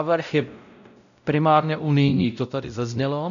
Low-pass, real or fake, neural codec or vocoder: 7.2 kHz; fake; codec, 16 kHz, 0.5 kbps, X-Codec, WavLM features, trained on Multilingual LibriSpeech